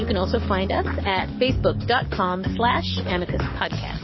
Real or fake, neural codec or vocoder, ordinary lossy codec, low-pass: fake; codec, 44.1 kHz, 7.8 kbps, DAC; MP3, 24 kbps; 7.2 kHz